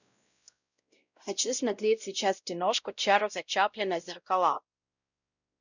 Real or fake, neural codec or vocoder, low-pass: fake; codec, 16 kHz, 0.5 kbps, X-Codec, WavLM features, trained on Multilingual LibriSpeech; 7.2 kHz